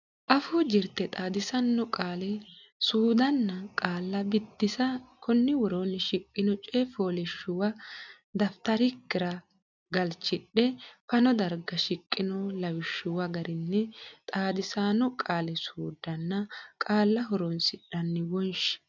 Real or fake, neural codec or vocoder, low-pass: real; none; 7.2 kHz